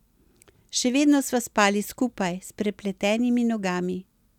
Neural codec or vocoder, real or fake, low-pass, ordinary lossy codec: none; real; 19.8 kHz; none